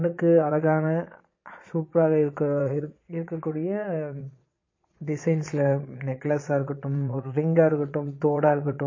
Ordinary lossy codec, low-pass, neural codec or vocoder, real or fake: MP3, 32 kbps; 7.2 kHz; vocoder, 22.05 kHz, 80 mel bands, Vocos; fake